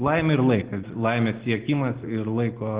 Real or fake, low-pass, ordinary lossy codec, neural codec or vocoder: real; 3.6 kHz; Opus, 16 kbps; none